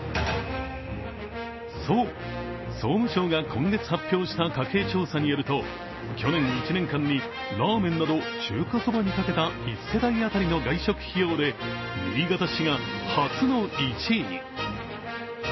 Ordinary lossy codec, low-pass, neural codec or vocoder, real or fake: MP3, 24 kbps; 7.2 kHz; none; real